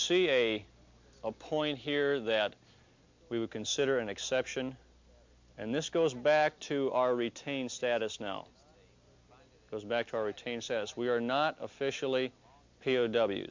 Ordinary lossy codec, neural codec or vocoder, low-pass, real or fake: MP3, 64 kbps; none; 7.2 kHz; real